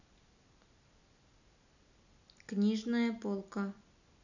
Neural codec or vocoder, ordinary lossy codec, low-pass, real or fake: none; none; 7.2 kHz; real